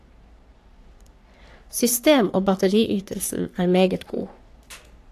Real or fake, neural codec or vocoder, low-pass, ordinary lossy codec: fake; codec, 44.1 kHz, 3.4 kbps, Pupu-Codec; 14.4 kHz; AAC, 64 kbps